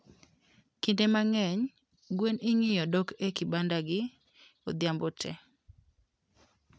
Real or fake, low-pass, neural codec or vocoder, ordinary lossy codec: real; none; none; none